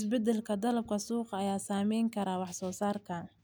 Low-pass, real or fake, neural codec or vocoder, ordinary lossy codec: none; real; none; none